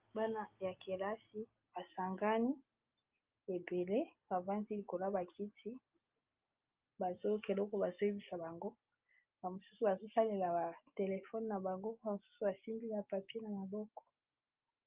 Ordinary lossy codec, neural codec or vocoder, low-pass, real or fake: Opus, 24 kbps; none; 3.6 kHz; real